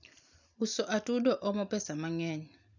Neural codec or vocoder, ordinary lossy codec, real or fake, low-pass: none; none; real; 7.2 kHz